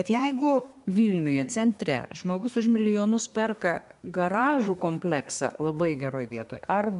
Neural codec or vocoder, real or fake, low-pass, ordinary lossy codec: codec, 24 kHz, 1 kbps, SNAC; fake; 10.8 kHz; AAC, 96 kbps